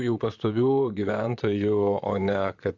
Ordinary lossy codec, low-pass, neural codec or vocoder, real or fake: AAC, 48 kbps; 7.2 kHz; vocoder, 44.1 kHz, 128 mel bands, Pupu-Vocoder; fake